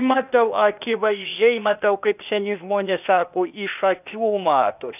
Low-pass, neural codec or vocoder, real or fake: 3.6 kHz; codec, 16 kHz, 0.8 kbps, ZipCodec; fake